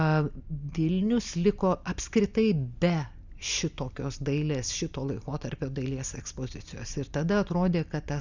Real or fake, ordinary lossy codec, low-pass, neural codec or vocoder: real; Opus, 64 kbps; 7.2 kHz; none